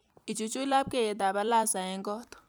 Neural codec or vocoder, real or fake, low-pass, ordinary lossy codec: vocoder, 44.1 kHz, 128 mel bands every 256 samples, BigVGAN v2; fake; none; none